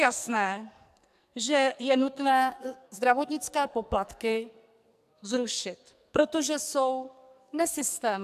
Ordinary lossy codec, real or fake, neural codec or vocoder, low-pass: AAC, 96 kbps; fake; codec, 44.1 kHz, 2.6 kbps, SNAC; 14.4 kHz